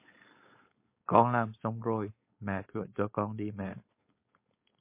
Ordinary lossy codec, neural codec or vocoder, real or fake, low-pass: MP3, 24 kbps; codec, 16 kHz, 4.8 kbps, FACodec; fake; 3.6 kHz